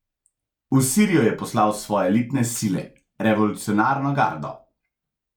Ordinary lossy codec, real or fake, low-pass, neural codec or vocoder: none; real; 19.8 kHz; none